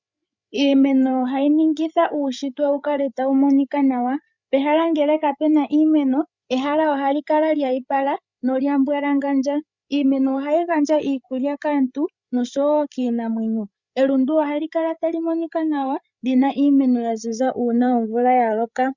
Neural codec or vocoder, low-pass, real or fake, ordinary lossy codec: codec, 16 kHz, 8 kbps, FreqCodec, larger model; 7.2 kHz; fake; Opus, 64 kbps